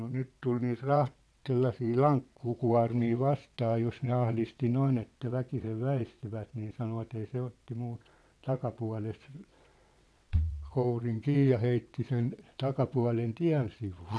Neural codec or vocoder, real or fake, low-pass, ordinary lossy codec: vocoder, 22.05 kHz, 80 mel bands, WaveNeXt; fake; none; none